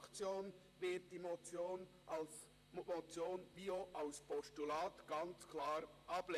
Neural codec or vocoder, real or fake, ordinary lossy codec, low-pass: vocoder, 44.1 kHz, 128 mel bands, Pupu-Vocoder; fake; AAC, 96 kbps; 14.4 kHz